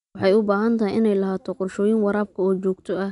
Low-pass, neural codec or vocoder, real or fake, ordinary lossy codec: 10.8 kHz; none; real; none